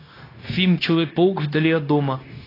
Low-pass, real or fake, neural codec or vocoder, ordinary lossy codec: 5.4 kHz; fake; codec, 16 kHz, 0.9 kbps, LongCat-Audio-Codec; AAC, 24 kbps